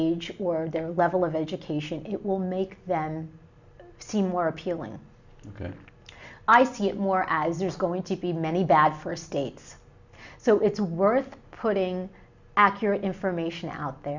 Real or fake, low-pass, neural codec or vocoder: real; 7.2 kHz; none